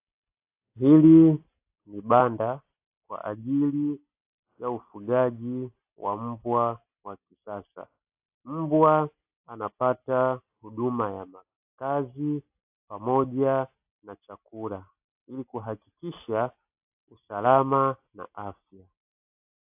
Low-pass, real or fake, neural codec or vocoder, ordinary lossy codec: 3.6 kHz; real; none; AAC, 32 kbps